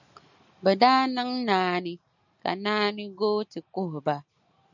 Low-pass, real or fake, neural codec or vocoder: 7.2 kHz; real; none